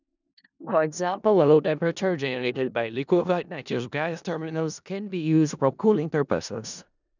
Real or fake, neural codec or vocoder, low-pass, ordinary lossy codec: fake; codec, 16 kHz in and 24 kHz out, 0.4 kbps, LongCat-Audio-Codec, four codebook decoder; 7.2 kHz; none